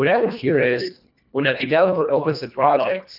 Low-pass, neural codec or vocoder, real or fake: 5.4 kHz; codec, 24 kHz, 1.5 kbps, HILCodec; fake